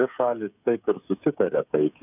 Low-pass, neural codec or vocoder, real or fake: 3.6 kHz; codec, 16 kHz, 8 kbps, FreqCodec, smaller model; fake